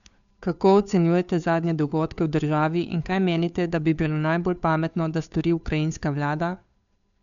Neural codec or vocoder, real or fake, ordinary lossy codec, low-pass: codec, 16 kHz, 2 kbps, FunCodec, trained on Chinese and English, 25 frames a second; fake; none; 7.2 kHz